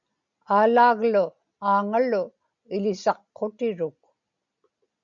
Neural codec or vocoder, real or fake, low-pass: none; real; 7.2 kHz